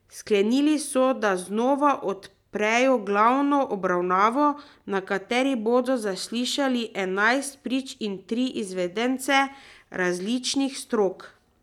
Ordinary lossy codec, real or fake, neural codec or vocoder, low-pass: none; real; none; 19.8 kHz